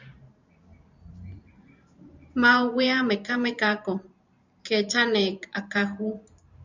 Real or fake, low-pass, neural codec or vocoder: fake; 7.2 kHz; vocoder, 44.1 kHz, 128 mel bands every 512 samples, BigVGAN v2